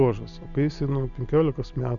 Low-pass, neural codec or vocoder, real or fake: 7.2 kHz; none; real